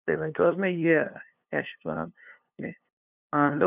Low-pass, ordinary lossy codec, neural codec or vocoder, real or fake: 3.6 kHz; none; codec, 16 kHz, 2 kbps, FunCodec, trained on LibriTTS, 25 frames a second; fake